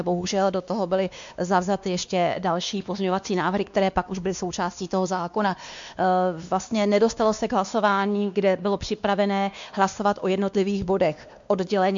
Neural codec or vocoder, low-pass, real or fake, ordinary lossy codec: codec, 16 kHz, 2 kbps, X-Codec, WavLM features, trained on Multilingual LibriSpeech; 7.2 kHz; fake; MP3, 64 kbps